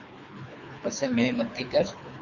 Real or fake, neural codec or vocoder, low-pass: fake; codec, 24 kHz, 3 kbps, HILCodec; 7.2 kHz